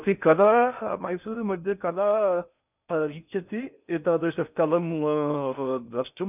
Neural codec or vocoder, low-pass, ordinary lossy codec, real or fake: codec, 16 kHz in and 24 kHz out, 0.6 kbps, FocalCodec, streaming, 4096 codes; 3.6 kHz; none; fake